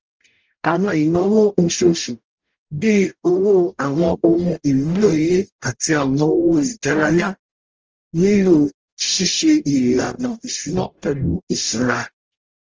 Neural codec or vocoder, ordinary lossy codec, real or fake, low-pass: codec, 44.1 kHz, 0.9 kbps, DAC; Opus, 24 kbps; fake; 7.2 kHz